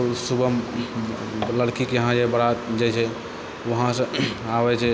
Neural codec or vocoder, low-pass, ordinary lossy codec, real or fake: none; none; none; real